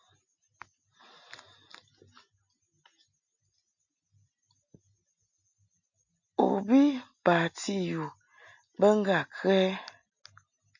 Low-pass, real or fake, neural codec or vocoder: 7.2 kHz; real; none